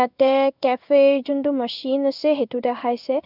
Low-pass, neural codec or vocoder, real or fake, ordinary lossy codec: 5.4 kHz; codec, 16 kHz in and 24 kHz out, 1 kbps, XY-Tokenizer; fake; none